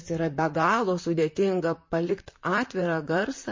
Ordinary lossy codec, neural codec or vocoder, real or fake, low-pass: MP3, 32 kbps; vocoder, 44.1 kHz, 128 mel bands, Pupu-Vocoder; fake; 7.2 kHz